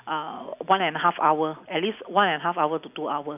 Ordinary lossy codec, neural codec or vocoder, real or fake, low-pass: none; none; real; 3.6 kHz